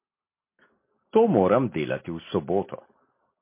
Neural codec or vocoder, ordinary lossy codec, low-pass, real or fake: none; MP3, 24 kbps; 3.6 kHz; real